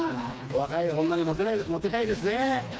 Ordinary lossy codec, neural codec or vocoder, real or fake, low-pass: none; codec, 16 kHz, 2 kbps, FreqCodec, smaller model; fake; none